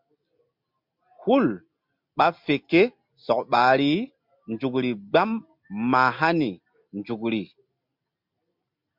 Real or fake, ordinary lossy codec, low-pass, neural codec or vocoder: real; MP3, 48 kbps; 5.4 kHz; none